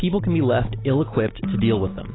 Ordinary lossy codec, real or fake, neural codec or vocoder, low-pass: AAC, 16 kbps; real; none; 7.2 kHz